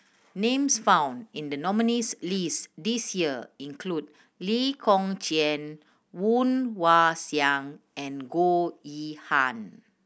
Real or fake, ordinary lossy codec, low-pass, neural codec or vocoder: real; none; none; none